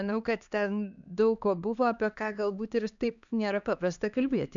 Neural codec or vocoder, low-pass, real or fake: codec, 16 kHz, 2 kbps, X-Codec, HuBERT features, trained on LibriSpeech; 7.2 kHz; fake